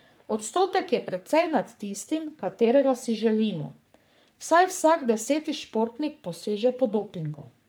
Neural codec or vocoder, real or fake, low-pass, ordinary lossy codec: codec, 44.1 kHz, 3.4 kbps, Pupu-Codec; fake; none; none